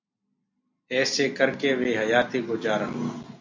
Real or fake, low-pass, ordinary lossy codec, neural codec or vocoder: real; 7.2 kHz; MP3, 48 kbps; none